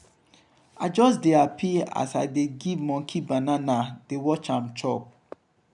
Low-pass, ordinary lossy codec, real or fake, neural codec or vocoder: 10.8 kHz; none; real; none